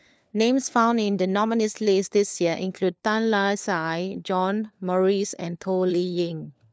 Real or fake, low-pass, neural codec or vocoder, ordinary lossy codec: fake; none; codec, 16 kHz, 4 kbps, FunCodec, trained on LibriTTS, 50 frames a second; none